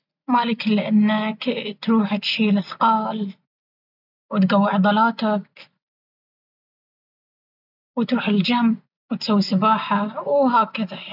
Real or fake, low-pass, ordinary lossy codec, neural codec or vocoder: real; 5.4 kHz; none; none